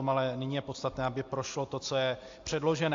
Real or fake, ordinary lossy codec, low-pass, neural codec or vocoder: real; AAC, 48 kbps; 7.2 kHz; none